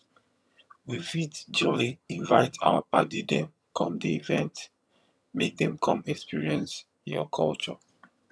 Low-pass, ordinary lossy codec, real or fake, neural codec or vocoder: none; none; fake; vocoder, 22.05 kHz, 80 mel bands, HiFi-GAN